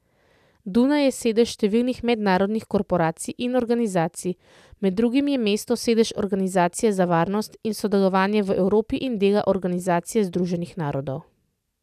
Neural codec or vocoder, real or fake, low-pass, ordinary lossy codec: none; real; 14.4 kHz; none